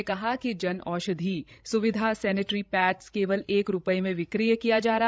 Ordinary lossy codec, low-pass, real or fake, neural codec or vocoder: none; none; fake; codec, 16 kHz, 16 kbps, FreqCodec, larger model